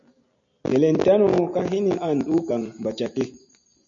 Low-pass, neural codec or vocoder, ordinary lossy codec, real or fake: 7.2 kHz; none; MP3, 48 kbps; real